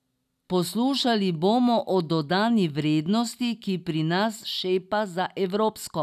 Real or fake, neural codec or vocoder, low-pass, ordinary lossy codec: real; none; 14.4 kHz; none